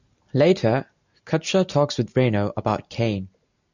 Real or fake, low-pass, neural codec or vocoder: real; 7.2 kHz; none